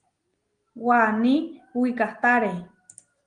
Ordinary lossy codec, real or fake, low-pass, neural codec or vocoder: Opus, 24 kbps; real; 9.9 kHz; none